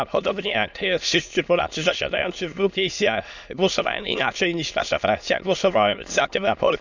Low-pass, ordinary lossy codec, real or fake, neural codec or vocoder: 7.2 kHz; none; fake; autoencoder, 22.05 kHz, a latent of 192 numbers a frame, VITS, trained on many speakers